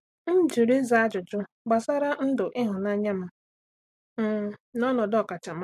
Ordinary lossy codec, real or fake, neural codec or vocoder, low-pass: MP3, 64 kbps; real; none; 14.4 kHz